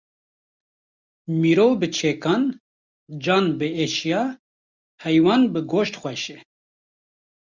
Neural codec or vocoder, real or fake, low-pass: none; real; 7.2 kHz